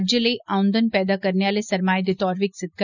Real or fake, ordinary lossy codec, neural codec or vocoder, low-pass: real; none; none; 7.2 kHz